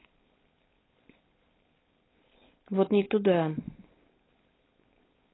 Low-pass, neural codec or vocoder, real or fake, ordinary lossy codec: 7.2 kHz; codec, 16 kHz, 4.8 kbps, FACodec; fake; AAC, 16 kbps